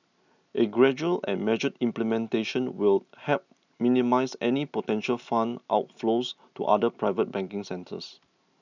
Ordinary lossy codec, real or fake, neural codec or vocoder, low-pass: none; real; none; 7.2 kHz